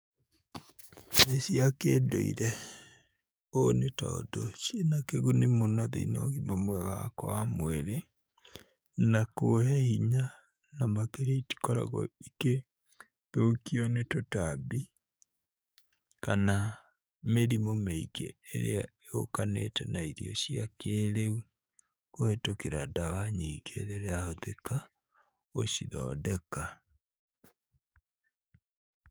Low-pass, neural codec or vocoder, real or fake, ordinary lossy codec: none; codec, 44.1 kHz, 7.8 kbps, DAC; fake; none